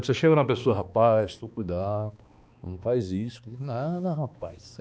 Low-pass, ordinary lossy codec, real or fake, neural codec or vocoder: none; none; fake; codec, 16 kHz, 2 kbps, X-Codec, HuBERT features, trained on balanced general audio